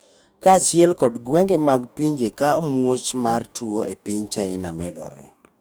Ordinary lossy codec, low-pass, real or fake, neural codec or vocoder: none; none; fake; codec, 44.1 kHz, 2.6 kbps, DAC